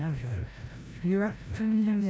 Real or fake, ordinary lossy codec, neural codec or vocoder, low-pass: fake; none; codec, 16 kHz, 0.5 kbps, FreqCodec, larger model; none